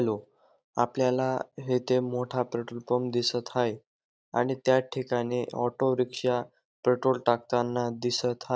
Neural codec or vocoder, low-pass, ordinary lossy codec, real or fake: none; none; none; real